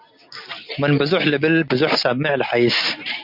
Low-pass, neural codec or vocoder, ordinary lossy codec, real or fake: 5.4 kHz; none; MP3, 48 kbps; real